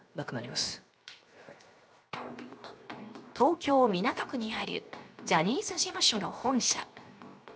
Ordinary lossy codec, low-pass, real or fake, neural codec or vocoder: none; none; fake; codec, 16 kHz, 0.7 kbps, FocalCodec